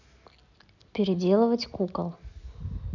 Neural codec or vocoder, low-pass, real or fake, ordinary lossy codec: none; 7.2 kHz; real; none